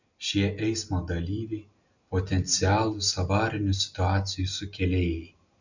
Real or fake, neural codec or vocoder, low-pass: real; none; 7.2 kHz